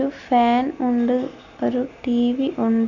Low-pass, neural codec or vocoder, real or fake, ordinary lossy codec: 7.2 kHz; none; real; none